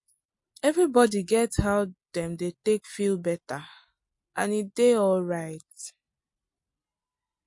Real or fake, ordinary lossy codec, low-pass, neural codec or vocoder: real; MP3, 48 kbps; 10.8 kHz; none